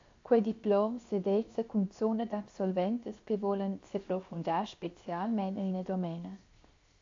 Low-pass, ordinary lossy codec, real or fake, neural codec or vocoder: 7.2 kHz; AAC, 48 kbps; fake; codec, 16 kHz, 0.7 kbps, FocalCodec